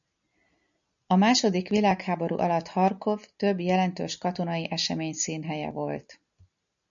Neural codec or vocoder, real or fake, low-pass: none; real; 7.2 kHz